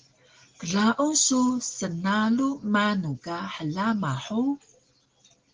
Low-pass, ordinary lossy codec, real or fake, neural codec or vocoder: 7.2 kHz; Opus, 16 kbps; real; none